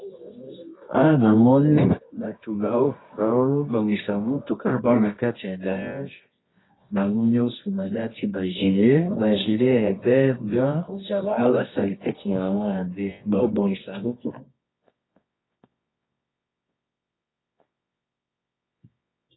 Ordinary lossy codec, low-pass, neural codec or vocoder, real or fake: AAC, 16 kbps; 7.2 kHz; codec, 24 kHz, 0.9 kbps, WavTokenizer, medium music audio release; fake